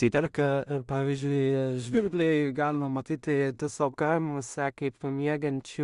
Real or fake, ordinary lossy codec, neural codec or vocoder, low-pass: fake; Opus, 64 kbps; codec, 16 kHz in and 24 kHz out, 0.4 kbps, LongCat-Audio-Codec, two codebook decoder; 10.8 kHz